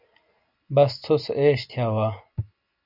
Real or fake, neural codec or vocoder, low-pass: real; none; 5.4 kHz